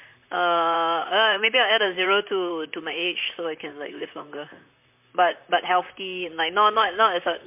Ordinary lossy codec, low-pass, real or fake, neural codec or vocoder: MP3, 32 kbps; 3.6 kHz; fake; vocoder, 44.1 kHz, 128 mel bands, Pupu-Vocoder